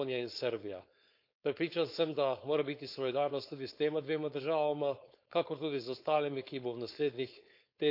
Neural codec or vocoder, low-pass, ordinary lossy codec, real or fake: codec, 16 kHz, 4.8 kbps, FACodec; 5.4 kHz; none; fake